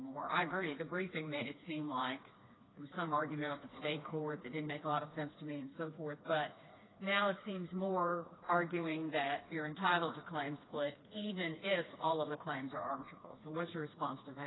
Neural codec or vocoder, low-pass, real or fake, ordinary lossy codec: codec, 16 kHz, 2 kbps, FreqCodec, smaller model; 7.2 kHz; fake; AAC, 16 kbps